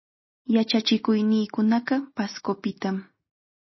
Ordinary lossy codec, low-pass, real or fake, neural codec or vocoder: MP3, 24 kbps; 7.2 kHz; real; none